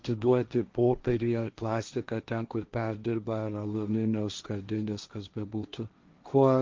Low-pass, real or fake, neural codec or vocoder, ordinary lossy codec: 7.2 kHz; fake; codec, 16 kHz, 1.1 kbps, Voila-Tokenizer; Opus, 24 kbps